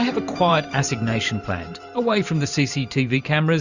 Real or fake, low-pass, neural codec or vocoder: fake; 7.2 kHz; vocoder, 44.1 kHz, 128 mel bands every 512 samples, BigVGAN v2